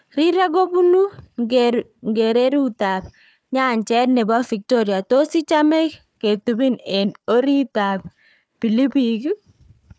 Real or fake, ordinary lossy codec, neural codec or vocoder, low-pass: fake; none; codec, 16 kHz, 4 kbps, FunCodec, trained on Chinese and English, 50 frames a second; none